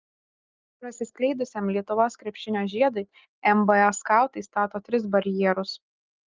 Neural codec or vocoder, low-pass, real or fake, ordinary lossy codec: none; 7.2 kHz; real; Opus, 32 kbps